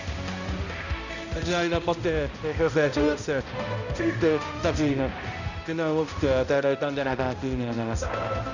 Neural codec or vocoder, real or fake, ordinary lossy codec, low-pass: codec, 16 kHz, 0.5 kbps, X-Codec, HuBERT features, trained on balanced general audio; fake; none; 7.2 kHz